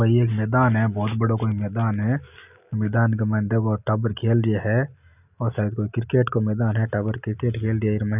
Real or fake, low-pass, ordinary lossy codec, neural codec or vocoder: real; 3.6 kHz; none; none